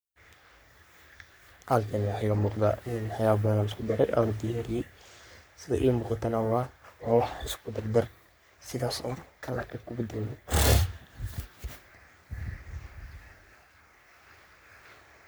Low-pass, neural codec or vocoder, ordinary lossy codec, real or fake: none; codec, 44.1 kHz, 3.4 kbps, Pupu-Codec; none; fake